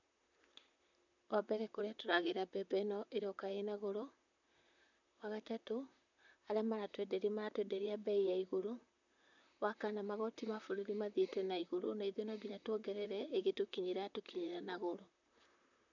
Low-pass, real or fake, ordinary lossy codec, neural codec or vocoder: 7.2 kHz; fake; none; vocoder, 44.1 kHz, 128 mel bands, Pupu-Vocoder